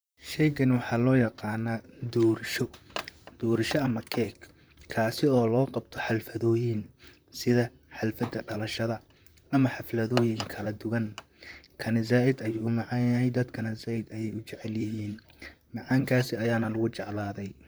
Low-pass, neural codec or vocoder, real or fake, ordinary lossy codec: none; vocoder, 44.1 kHz, 128 mel bands, Pupu-Vocoder; fake; none